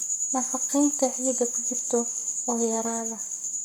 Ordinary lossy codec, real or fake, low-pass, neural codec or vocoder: none; fake; none; codec, 44.1 kHz, 7.8 kbps, Pupu-Codec